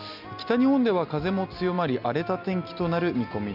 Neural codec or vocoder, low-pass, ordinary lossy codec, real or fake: none; 5.4 kHz; none; real